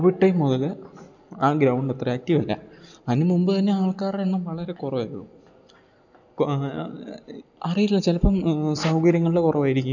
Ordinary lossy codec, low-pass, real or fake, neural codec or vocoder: none; 7.2 kHz; real; none